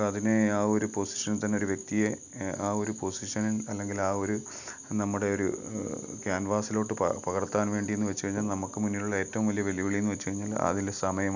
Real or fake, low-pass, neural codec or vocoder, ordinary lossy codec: real; 7.2 kHz; none; none